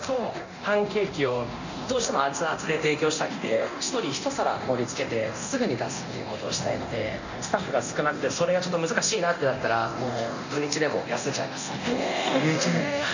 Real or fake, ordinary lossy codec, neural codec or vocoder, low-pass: fake; none; codec, 24 kHz, 0.9 kbps, DualCodec; 7.2 kHz